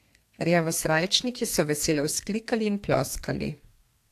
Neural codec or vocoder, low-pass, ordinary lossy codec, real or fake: codec, 32 kHz, 1.9 kbps, SNAC; 14.4 kHz; AAC, 64 kbps; fake